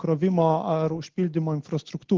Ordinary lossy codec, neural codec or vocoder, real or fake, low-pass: Opus, 16 kbps; none; real; 7.2 kHz